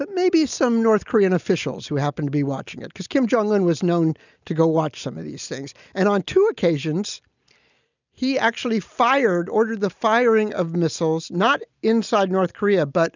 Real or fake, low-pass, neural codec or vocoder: real; 7.2 kHz; none